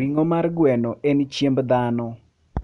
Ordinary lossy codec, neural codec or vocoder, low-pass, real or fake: none; none; 10.8 kHz; real